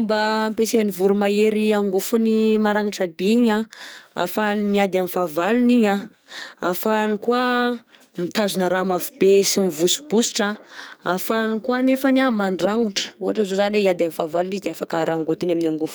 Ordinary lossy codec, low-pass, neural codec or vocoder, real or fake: none; none; codec, 44.1 kHz, 2.6 kbps, SNAC; fake